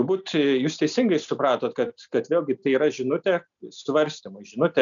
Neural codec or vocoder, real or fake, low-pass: none; real; 7.2 kHz